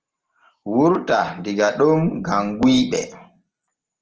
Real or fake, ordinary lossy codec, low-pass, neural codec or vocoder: real; Opus, 16 kbps; 7.2 kHz; none